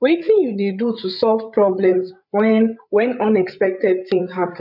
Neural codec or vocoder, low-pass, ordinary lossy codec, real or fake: codec, 16 kHz, 8 kbps, FreqCodec, larger model; 5.4 kHz; none; fake